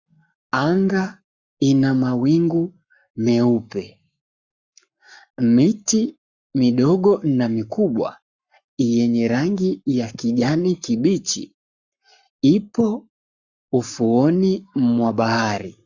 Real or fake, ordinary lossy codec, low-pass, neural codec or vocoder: fake; Opus, 64 kbps; 7.2 kHz; codec, 44.1 kHz, 7.8 kbps, Pupu-Codec